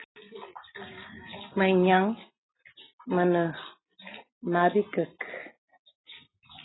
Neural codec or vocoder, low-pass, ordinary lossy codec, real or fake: none; 7.2 kHz; AAC, 16 kbps; real